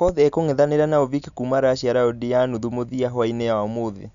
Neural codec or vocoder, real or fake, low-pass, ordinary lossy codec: none; real; 7.2 kHz; none